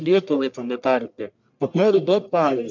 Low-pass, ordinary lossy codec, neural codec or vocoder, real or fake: 7.2 kHz; MP3, 64 kbps; codec, 44.1 kHz, 1.7 kbps, Pupu-Codec; fake